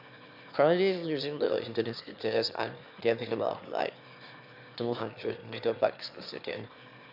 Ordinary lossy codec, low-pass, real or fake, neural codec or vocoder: MP3, 48 kbps; 5.4 kHz; fake; autoencoder, 22.05 kHz, a latent of 192 numbers a frame, VITS, trained on one speaker